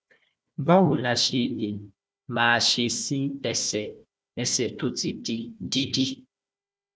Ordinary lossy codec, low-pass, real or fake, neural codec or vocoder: none; none; fake; codec, 16 kHz, 1 kbps, FunCodec, trained on Chinese and English, 50 frames a second